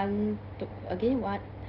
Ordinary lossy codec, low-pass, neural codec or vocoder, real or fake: Opus, 24 kbps; 5.4 kHz; none; real